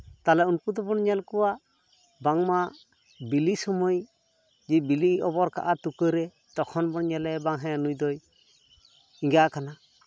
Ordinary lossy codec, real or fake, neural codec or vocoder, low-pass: none; real; none; none